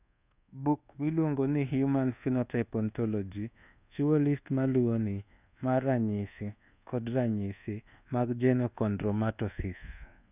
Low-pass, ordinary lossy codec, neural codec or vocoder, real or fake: 3.6 kHz; none; codec, 24 kHz, 1.2 kbps, DualCodec; fake